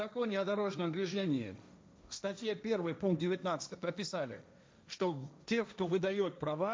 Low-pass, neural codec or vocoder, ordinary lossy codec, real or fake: none; codec, 16 kHz, 1.1 kbps, Voila-Tokenizer; none; fake